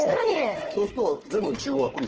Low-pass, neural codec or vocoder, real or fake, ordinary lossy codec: 7.2 kHz; codec, 16 kHz, 4 kbps, FunCodec, trained on Chinese and English, 50 frames a second; fake; Opus, 16 kbps